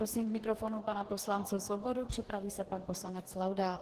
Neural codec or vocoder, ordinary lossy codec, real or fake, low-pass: codec, 44.1 kHz, 2.6 kbps, DAC; Opus, 16 kbps; fake; 14.4 kHz